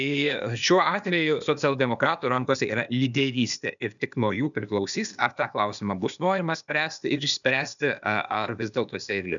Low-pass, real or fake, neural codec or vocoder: 7.2 kHz; fake; codec, 16 kHz, 0.8 kbps, ZipCodec